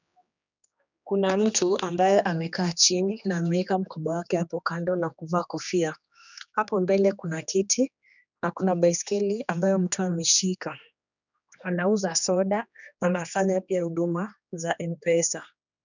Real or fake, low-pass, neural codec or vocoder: fake; 7.2 kHz; codec, 16 kHz, 2 kbps, X-Codec, HuBERT features, trained on general audio